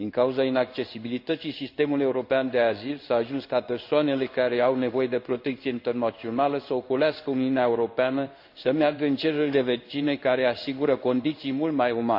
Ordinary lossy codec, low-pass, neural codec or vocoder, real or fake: AAC, 48 kbps; 5.4 kHz; codec, 16 kHz in and 24 kHz out, 1 kbps, XY-Tokenizer; fake